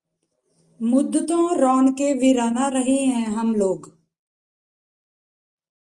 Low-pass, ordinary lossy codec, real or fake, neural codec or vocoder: 10.8 kHz; Opus, 32 kbps; real; none